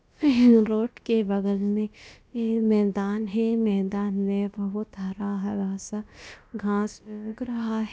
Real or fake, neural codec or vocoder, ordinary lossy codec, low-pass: fake; codec, 16 kHz, about 1 kbps, DyCAST, with the encoder's durations; none; none